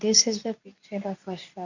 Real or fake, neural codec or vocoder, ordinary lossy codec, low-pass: fake; codec, 24 kHz, 0.9 kbps, WavTokenizer, medium speech release version 2; none; 7.2 kHz